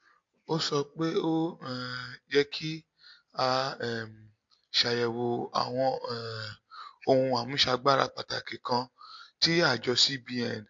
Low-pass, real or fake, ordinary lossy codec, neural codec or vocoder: 7.2 kHz; real; MP3, 48 kbps; none